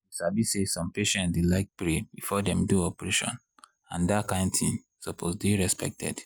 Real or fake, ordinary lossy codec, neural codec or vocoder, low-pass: real; none; none; none